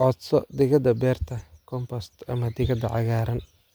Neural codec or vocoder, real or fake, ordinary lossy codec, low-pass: none; real; none; none